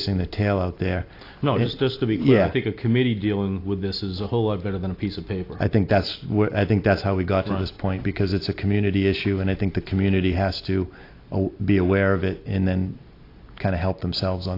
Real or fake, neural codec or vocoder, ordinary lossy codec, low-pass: real; none; AAC, 32 kbps; 5.4 kHz